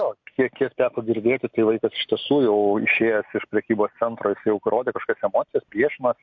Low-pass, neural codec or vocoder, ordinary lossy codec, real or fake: 7.2 kHz; none; MP3, 48 kbps; real